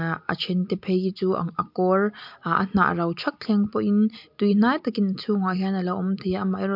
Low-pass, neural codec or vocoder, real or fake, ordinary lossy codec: 5.4 kHz; none; real; MP3, 48 kbps